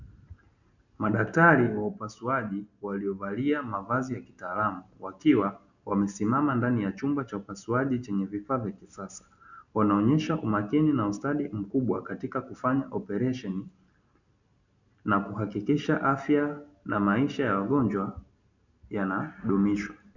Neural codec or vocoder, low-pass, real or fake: none; 7.2 kHz; real